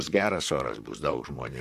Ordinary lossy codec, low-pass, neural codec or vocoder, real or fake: MP3, 96 kbps; 14.4 kHz; vocoder, 44.1 kHz, 128 mel bands, Pupu-Vocoder; fake